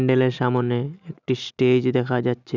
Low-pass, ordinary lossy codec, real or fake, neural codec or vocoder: 7.2 kHz; none; real; none